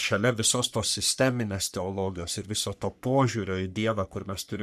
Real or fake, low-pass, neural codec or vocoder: fake; 14.4 kHz; codec, 44.1 kHz, 3.4 kbps, Pupu-Codec